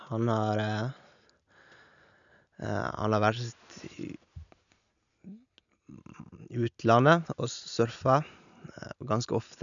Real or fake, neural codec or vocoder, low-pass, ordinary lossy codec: real; none; 7.2 kHz; none